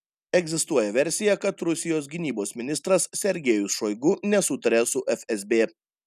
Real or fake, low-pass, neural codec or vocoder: real; 14.4 kHz; none